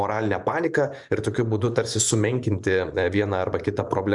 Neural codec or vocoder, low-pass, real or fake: vocoder, 24 kHz, 100 mel bands, Vocos; 10.8 kHz; fake